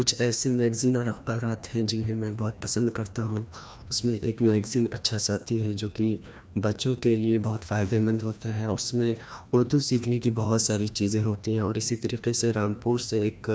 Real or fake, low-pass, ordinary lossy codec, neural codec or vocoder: fake; none; none; codec, 16 kHz, 1 kbps, FreqCodec, larger model